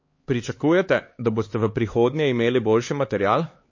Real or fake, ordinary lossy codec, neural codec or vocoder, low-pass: fake; MP3, 32 kbps; codec, 16 kHz, 4 kbps, X-Codec, HuBERT features, trained on LibriSpeech; 7.2 kHz